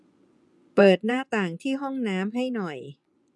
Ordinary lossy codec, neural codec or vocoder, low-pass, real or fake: none; vocoder, 24 kHz, 100 mel bands, Vocos; none; fake